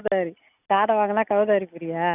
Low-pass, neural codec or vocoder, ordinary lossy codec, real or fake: 3.6 kHz; none; none; real